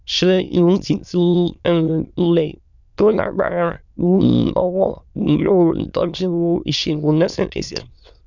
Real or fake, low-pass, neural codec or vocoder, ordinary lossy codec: fake; 7.2 kHz; autoencoder, 22.05 kHz, a latent of 192 numbers a frame, VITS, trained on many speakers; none